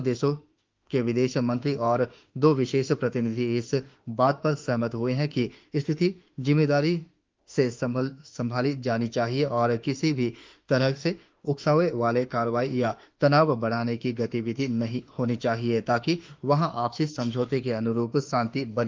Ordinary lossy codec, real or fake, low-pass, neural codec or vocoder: Opus, 32 kbps; fake; 7.2 kHz; autoencoder, 48 kHz, 32 numbers a frame, DAC-VAE, trained on Japanese speech